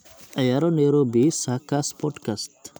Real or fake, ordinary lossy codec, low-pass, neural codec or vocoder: real; none; none; none